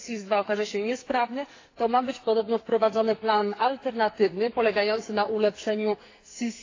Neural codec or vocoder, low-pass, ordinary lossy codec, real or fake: codec, 44.1 kHz, 2.6 kbps, SNAC; 7.2 kHz; AAC, 32 kbps; fake